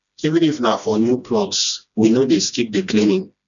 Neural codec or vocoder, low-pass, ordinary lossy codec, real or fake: codec, 16 kHz, 1 kbps, FreqCodec, smaller model; 7.2 kHz; none; fake